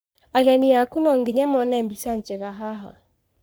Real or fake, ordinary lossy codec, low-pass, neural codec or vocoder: fake; none; none; codec, 44.1 kHz, 3.4 kbps, Pupu-Codec